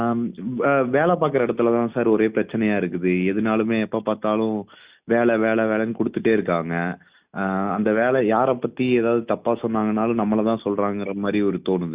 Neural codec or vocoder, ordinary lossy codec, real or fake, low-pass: none; Opus, 64 kbps; real; 3.6 kHz